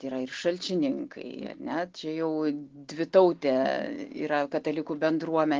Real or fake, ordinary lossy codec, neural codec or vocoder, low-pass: real; Opus, 16 kbps; none; 7.2 kHz